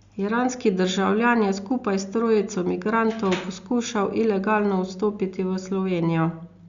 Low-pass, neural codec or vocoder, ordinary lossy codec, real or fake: 7.2 kHz; none; Opus, 64 kbps; real